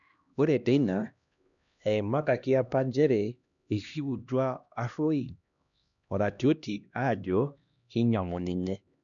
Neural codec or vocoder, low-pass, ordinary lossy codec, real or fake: codec, 16 kHz, 1 kbps, X-Codec, HuBERT features, trained on LibriSpeech; 7.2 kHz; none; fake